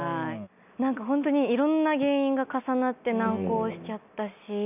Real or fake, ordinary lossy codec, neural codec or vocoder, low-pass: real; none; none; 3.6 kHz